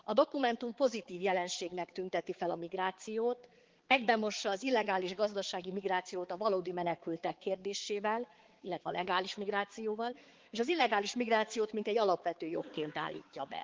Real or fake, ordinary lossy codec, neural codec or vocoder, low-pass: fake; Opus, 16 kbps; codec, 16 kHz, 4 kbps, X-Codec, HuBERT features, trained on balanced general audio; 7.2 kHz